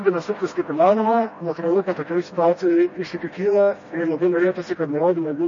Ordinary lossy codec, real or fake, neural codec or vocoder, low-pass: MP3, 32 kbps; fake; codec, 16 kHz, 1 kbps, FreqCodec, smaller model; 7.2 kHz